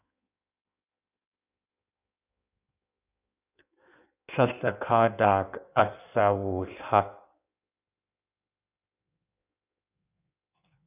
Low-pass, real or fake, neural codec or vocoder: 3.6 kHz; fake; codec, 16 kHz in and 24 kHz out, 1.1 kbps, FireRedTTS-2 codec